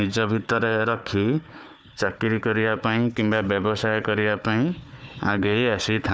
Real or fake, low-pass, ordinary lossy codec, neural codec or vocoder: fake; none; none; codec, 16 kHz, 4 kbps, FunCodec, trained on Chinese and English, 50 frames a second